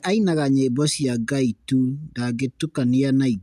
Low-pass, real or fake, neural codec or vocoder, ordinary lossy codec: 14.4 kHz; real; none; none